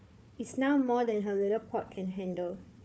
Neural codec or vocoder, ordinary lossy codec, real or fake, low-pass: codec, 16 kHz, 4 kbps, FunCodec, trained on Chinese and English, 50 frames a second; none; fake; none